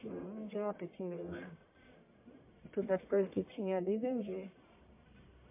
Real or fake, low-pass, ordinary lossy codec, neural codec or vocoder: fake; 3.6 kHz; MP3, 24 kbps; codec, 44.1 kHz, 1.7 kbps, Pupu-Codec